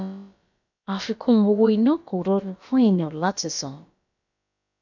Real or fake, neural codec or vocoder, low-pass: fake; codec, 16 kHz, about 1 kbps, DyCAST, with the encoder's durations; 7.2 kHz